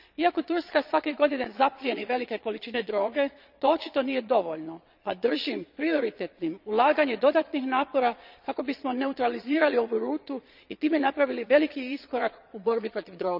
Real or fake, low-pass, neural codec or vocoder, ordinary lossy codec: fake; 5.4 kHz; vocoder, 22.05 kHz, 80 mel bands, Vocos; none